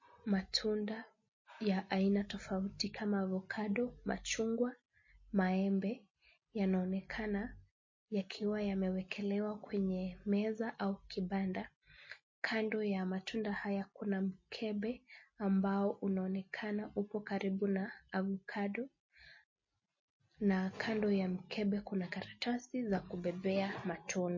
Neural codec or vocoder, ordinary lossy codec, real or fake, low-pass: none; MP3, 32 kbps; real; 7.2 kHz